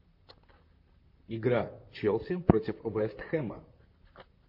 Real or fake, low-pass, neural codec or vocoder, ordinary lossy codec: fake; 5.4 kHz; vocoder, 44.1 kHz, 128 mel bands, Pupu-Vocoder; MP3, 32 kbps